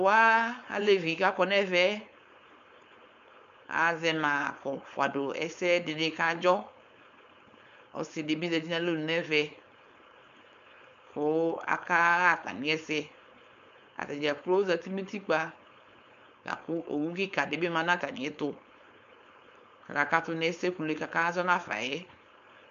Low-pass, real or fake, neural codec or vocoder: 7.2 kHz; fake; codec, 16 kHz, 4.8 kbps, FACodec